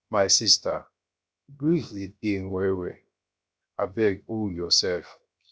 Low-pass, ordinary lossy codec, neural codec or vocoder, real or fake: none; none; codec, 16 kHz, 0.7 kbps, FocalCodec; fake